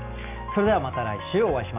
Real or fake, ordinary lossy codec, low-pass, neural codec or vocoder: real; none; 3.6 kHz; none